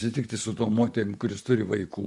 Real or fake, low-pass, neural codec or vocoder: fake; 10.8 kHz; vocoder, 44.1 kHz, 128 mel bands, Pupu-Vocoder